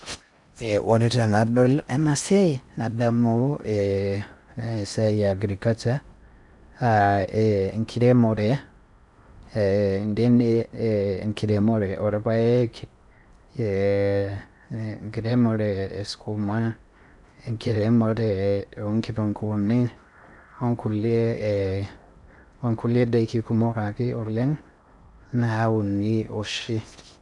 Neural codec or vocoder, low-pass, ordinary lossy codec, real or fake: codec, 16 kHz in and 24 kHz out, 0.6 kbps, FocalCodec, streaming, 4096 codes; 10.8 kHz; none; fake